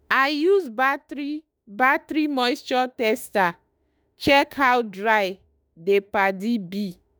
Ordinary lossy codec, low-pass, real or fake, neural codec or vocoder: none; none; fake; autoencoder, 48 kHz, 32 numbers a frame, DAC-VAE, trained on Japanese speech